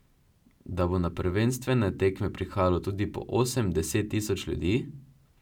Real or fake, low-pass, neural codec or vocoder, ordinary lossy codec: real; 19.8 kHz; none; none